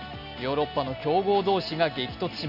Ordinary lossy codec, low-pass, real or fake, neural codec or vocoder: none; 5.4 kHz; real; none